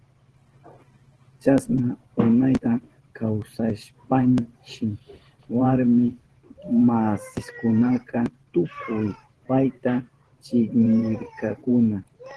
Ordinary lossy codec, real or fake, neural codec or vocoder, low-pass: Opus, 16 kbps; fake; vocoder, 44.1 kHz, 128 mel bands every 512 samples, BigVGAN v2; 10.8 kHz